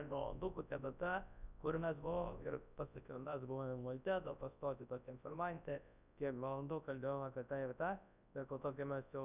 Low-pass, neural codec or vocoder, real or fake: 3.6 kHz; codec, 24 kHz, 0.9 kbps, WavTokenizer, large speech release; fake